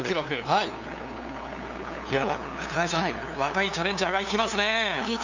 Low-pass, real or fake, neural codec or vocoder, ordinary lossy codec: 7.2 kHz; fake; codec, 16 kHz, 2 kbps, FunCodec, trained on LibriTTS, 25 frames a second; none